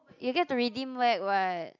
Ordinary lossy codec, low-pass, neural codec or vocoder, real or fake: none; 7.2 kHz; none; real